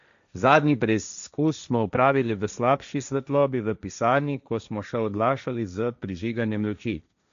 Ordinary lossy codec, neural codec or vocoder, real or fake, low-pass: AAC, 96 kbps; codec, 16 kHz, 1.1 kbps, Voila-Tokenizer; fake; 7.2 kHz